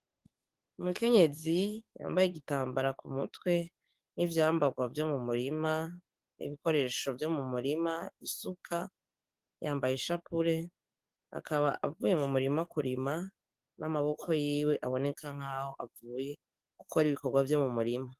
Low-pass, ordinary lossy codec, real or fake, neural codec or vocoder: 14.4 kHz; Opus, 24 kbps; fake; codec, 44.1 kHz, 7.8 kbps, DAC